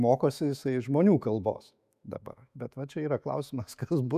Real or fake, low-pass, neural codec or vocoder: fake; 14.4 kHz; autoencoder, 48 kHz, 128 numbers a frame, DAC-VAE, trained on Japanese speech